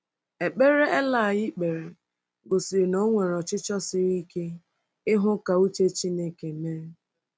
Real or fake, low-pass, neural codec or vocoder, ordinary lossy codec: real; none; none; none